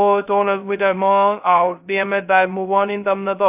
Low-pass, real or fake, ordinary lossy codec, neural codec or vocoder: 3.6 kHz; fake; AAC, 32 kbps; codec, 16 kHz, 0.2 kbps, FocalCodec